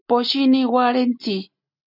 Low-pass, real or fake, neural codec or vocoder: 5.4 kHz; real; none